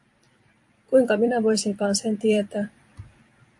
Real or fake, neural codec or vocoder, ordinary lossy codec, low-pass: fake; vocoder, 44.1 kHz, 128 mel bands every 256 samples, BigVGAN v2; AAC, 64 kbps; 10.8 kHz